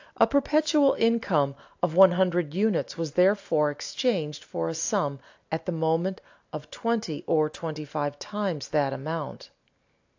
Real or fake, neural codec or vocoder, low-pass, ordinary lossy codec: real; none; 7.2 kHz; AAC, 48 kbps